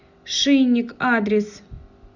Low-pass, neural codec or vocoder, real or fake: 7.2 kHz; none; real